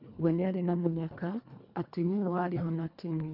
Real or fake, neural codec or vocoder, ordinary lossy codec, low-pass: fake; codec, 24 kHz, 1.5 kbps, HILCodec; none; 5.4 kHz